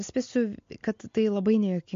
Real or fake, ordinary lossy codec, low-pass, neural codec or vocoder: real; MP3, 48 kbps; 7.2 kHz; none